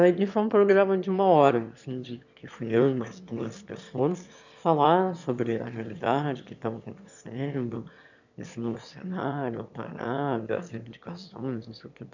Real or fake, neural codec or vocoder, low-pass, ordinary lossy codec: fake; autoencoder, 22.05 kHz, a latent of 192 numbers a frame, VITS, trained on one speaker; 7.2 kHz; none